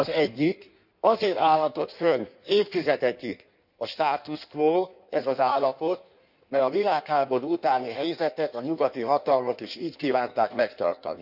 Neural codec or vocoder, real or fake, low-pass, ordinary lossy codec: codec, 16 kHz in and 24 kHz out, 1.1 kbps, FireRedTTS-2 codec; fake; 5.4 kHz; none